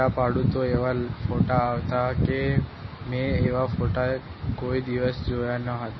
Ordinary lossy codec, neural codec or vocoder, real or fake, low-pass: MP3, 24 kbps; none; real; 7.2 kHz